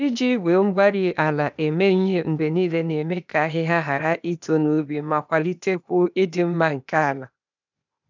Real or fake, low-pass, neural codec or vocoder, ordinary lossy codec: fake; 7.2 kHz; codec, 16 kHz, 0.8 kbps, ZipCodec; none